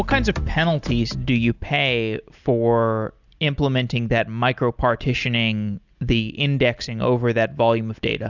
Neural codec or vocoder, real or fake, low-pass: none; real; 7.2 kHz